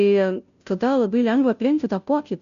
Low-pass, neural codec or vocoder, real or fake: 7.2 kHz; codec, 16 kHz, 0.5 kbps, FunCodec, trained on Chinese and English, 25 frames a second; fake